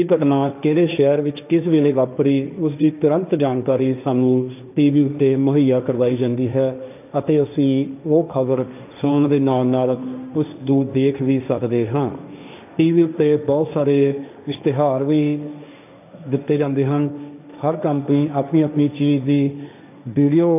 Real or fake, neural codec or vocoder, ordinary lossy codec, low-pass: fake; codec, 16 kHz, 1.1 kbps, Voila-Tokenizer; none; 3.6 kHz